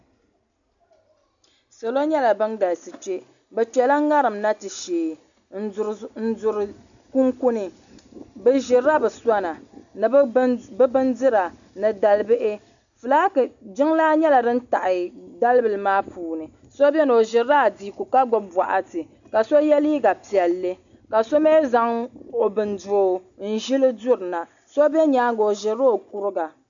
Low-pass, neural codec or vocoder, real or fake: 7.2 kHz; none; real